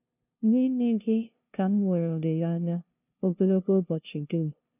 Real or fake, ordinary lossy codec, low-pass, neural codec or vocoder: fake; none; 3.6 kHz; codec, 16 kHz, 0.5 kbps, FunCodec, trained on LibriTTS, 25 frames a second